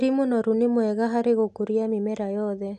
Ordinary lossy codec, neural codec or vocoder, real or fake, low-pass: AAC, 96 kbps; none; real; 9.9 kHz